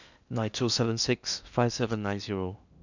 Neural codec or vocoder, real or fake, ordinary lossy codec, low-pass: codec, 16 kHz in and 24 kHz out, 0.8 kbps, FocalCodec, streaming, 65536 codes; fake; none; 7.2 kHz